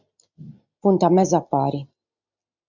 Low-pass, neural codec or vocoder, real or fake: 7.2 kHz; none; real